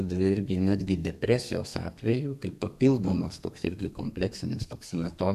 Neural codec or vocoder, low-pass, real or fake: codec, 32 kHz, 1.9 kbps, SNAC; 14.4 kHz; fake